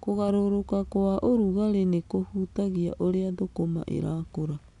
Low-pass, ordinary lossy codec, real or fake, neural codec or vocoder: 10.8 kHz; none; real; none